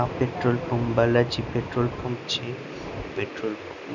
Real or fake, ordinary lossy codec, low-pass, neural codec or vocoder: real; none; 7.2 kHz; none